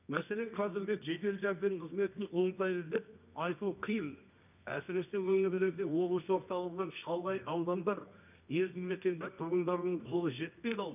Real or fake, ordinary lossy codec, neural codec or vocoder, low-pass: fake; none; codec, 24 kHz, 0.9 kbps, WavTokenizer, medium music audio release; 3.6 kHz